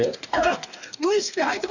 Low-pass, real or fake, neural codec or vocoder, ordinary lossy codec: 7.2 kHz; fake; codec, 44.1 kHz, 2.6 kbps, DAC; none